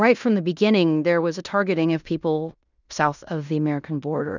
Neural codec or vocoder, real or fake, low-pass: codec, 16 kHz in and 24 kHz out, 0.9 kbps, LongCat-Audio-Codec, fine tuned four codebook decoder; fake; 7.2 kHz